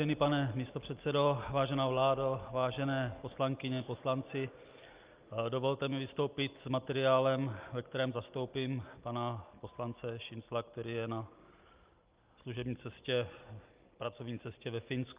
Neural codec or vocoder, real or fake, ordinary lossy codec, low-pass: none; real; Opus, 24 kbps; 3.6 kHz